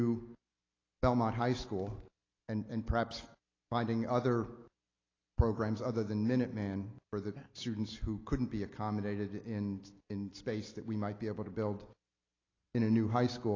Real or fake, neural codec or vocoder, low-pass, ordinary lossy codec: real; none; 7.2 kHz; AAC, 32 kbps